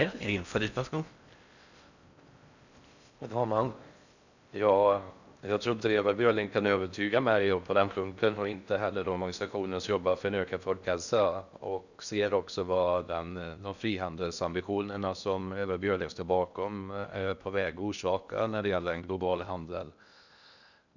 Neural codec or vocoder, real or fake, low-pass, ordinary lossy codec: codec, 16 kHz in and 24 kHz out, 0.6 kbps, FocalCodec, streaming, 4096 codes; fake; 7.2 kHz; none